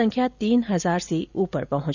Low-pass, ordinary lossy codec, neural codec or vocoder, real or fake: 7.2 kHz; none; none; real